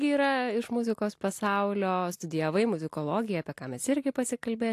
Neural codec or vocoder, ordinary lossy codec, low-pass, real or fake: none; AAC, 64 kbps; 14.4 kHz; real